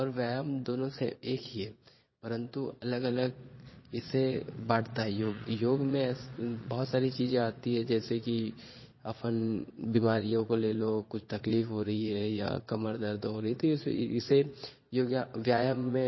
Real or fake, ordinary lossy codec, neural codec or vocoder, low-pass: fake; MP3, 24 kbps; vocoder, 22.05 kHz, 80 mel bands, Vocos; 7.2 kHz